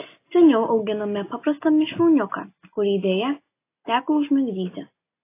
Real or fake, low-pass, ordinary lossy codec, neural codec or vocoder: real; 3.6 kHz; AAC, 24 kbps; none